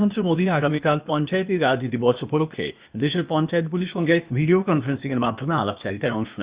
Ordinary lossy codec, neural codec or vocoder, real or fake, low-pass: Opus, 64 kbps; codec, 16 kHz, 0.8 kbps, ZipCodec; fake; 3.6 kHz